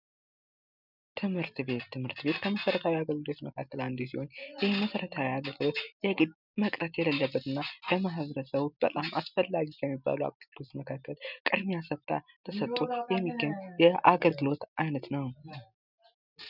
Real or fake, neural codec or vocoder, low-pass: real; none; 5.4 kHz